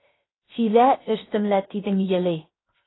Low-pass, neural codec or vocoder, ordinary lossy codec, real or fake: 7.2 kHz; codec, 16 kHz, 0.7 kbps, FocalCodec; AAC, 16 kbps; fake